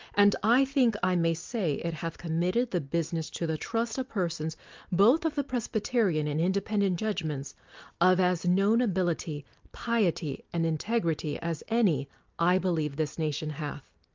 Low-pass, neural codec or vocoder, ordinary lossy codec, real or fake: 7.2 kHz; none; Opus, 32 kbps; real